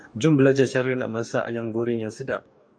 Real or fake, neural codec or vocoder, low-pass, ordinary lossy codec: fake; codec, 44.1 kHz, 2.6 kbps, DAC; 9.9 kHz; AAC, 64 kbps